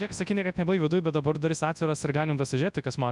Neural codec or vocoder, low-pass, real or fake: codec, 24 kHz, 0.9 kbps, WavTokenizer, large speech release; 10.8 kHz; fake